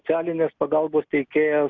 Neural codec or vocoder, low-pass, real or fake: none; 7.2 kHz; real